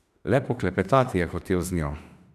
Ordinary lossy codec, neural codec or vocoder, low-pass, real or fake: none; autoencoder, 48 kHz, 32 numbers a frame, DAC-VAE, trained on Japanese speech; 14.4 kHz; fake